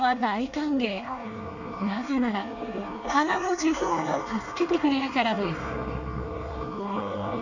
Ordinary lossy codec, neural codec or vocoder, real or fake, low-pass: none; codec, 24 kHz, 1 kbps, SNAC; fake; 7.2 kHz